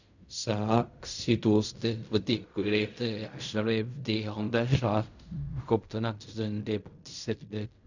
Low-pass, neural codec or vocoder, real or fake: 7.2 kHz; codec, 16 kHz in and 24 kHz out, 0.4 kbps, LongCat-Audio-Codec, fine tuned four codebook decoder; fake